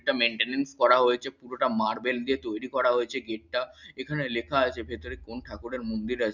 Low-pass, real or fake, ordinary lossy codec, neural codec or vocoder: 7.2 kHz; real; none; none